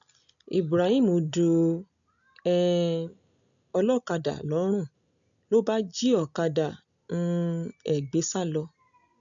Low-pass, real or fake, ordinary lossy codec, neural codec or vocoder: 7.2 kHz; real; none; none